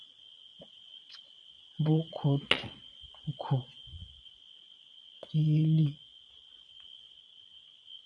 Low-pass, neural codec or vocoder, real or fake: 9.9 kHz; vocoder, 22.05 kHz, 80 mel bands, Vocos; fake